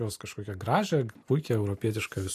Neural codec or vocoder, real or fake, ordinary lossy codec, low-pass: none; real; AAC, 64 kbps; 14.4 kHz